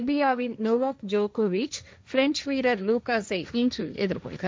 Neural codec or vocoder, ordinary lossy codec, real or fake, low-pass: codec, 16 kHz, 1.1 kbps, Voila-Tokenizer; none; fake; none